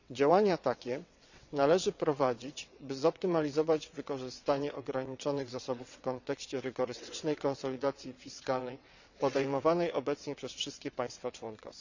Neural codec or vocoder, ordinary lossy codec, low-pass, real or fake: vocoder, 22.05 kHz, 80 mel bands, WaveNeXt; none; 7.2 kHz; fake